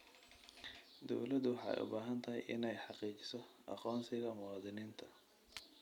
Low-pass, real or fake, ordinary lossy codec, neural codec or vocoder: 19.8 kHz; fake; MP3, 96 kbps; vocoder, 44.1 kHz, 128 mel bands every 256 samples, BigVGAN v2